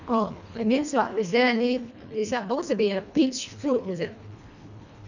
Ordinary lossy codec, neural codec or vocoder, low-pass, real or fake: none; codec, 24 kHz, 1.5 kbps, HILCodec; 7.2 kHz; fake